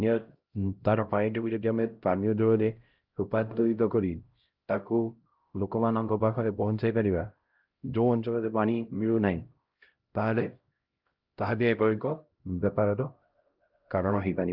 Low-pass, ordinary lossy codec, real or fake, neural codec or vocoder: 5.4 kHz; Opus, 32 kbps; fake; codec, 16 kHz, 0.5 kbps, X-Codec, HuBERT features, trained on LibriSpeech